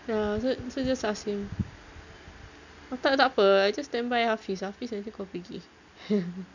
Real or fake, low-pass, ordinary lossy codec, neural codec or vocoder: real; 7.2 kHz; none; none